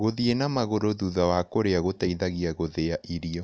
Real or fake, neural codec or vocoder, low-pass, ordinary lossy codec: real; none; none; none